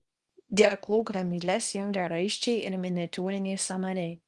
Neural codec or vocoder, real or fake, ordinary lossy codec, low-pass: codec, 24 kHz, 0.9 kbps, WavTokenizer, small release; fake; Opus, 32 kbps; 10.8 kHz